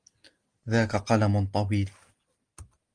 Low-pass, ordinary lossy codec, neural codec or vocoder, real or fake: 9.9 kHz; Opus, 24 kbps; none; real